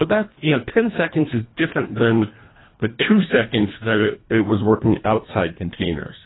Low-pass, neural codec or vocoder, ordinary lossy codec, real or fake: 7.2 kHz; codec, 24 kHz, 1.5 kbps, HILCodec; AAC, 16 kbps; fake